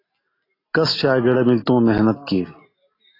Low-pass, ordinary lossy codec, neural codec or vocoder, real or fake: 5.4 kHz; AAC, 24 kbps; none; real